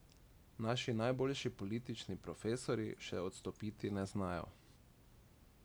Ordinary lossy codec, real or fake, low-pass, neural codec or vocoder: none; real; none; none